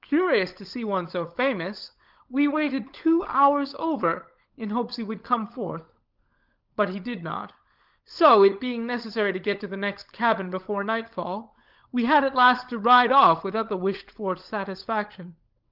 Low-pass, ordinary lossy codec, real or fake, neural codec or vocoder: 5.4 kHz; Opus, 32 kbps; fake; codec, 16 kHz, 16 kbps, FunCodec, trained on LibriTTS, 50 frames a second